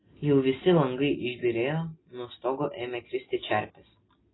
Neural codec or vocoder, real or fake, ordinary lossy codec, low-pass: none; real; AAC, 16 kbps; 7.2 kHz